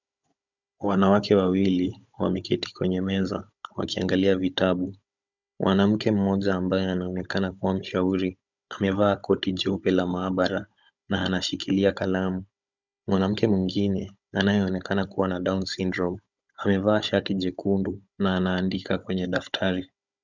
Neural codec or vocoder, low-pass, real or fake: codec, 16 kHz, 16 kbps, FunCodec, trained on Chinese and English, 50 frames a second; 7.2 kHz; fake